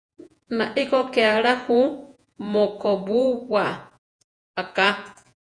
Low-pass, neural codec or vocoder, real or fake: 9.9 kHz; vocoder, 48 kHz, 128 mel bands, Vocos; fake